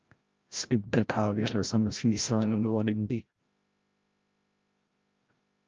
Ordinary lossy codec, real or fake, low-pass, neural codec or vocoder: Opus, 24 kbps; fake; 7.2 kHz; codec, 16 kHz, 0.5 kbps, FreqCodec, larger model